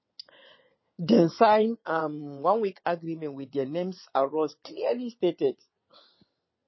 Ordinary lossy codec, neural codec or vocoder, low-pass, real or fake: MP3, 24 kbps; vocoder, 22.05 kHz, 80 mel bands, WaveNeXt; 7.2 kHz; fake